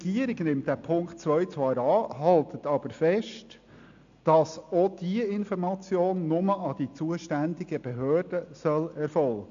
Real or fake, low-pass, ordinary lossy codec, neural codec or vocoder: real; 7.2 kHz; AAC, 64 kbps; none